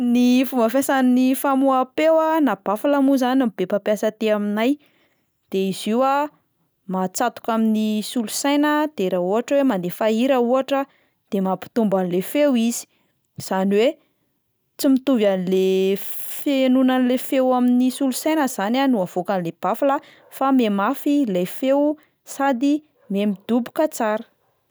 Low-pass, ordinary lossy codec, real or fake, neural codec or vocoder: none; none; real; none